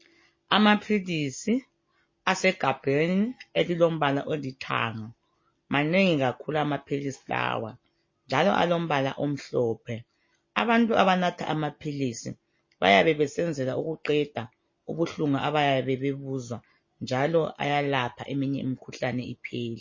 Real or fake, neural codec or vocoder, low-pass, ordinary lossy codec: real; none; 7.2 kHz; MP3, 32 kbps